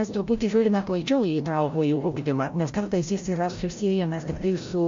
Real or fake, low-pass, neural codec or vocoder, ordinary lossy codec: fake; 7.2 kHz; codec, 16 kHz, 0.5 kbps, FreqCodec, larger model; MP3, 48 kbps